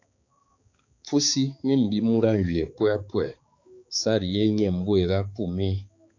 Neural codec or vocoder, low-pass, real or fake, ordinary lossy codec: codec, 16 kHz, 4 kbps, X-Codec, HuBERT features, trained on balanced general audio; 7.2 kHz; fake; AAC, 48 kbps